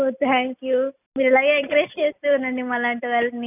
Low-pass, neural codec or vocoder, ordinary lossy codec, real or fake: 3.6 kHz; none; none; real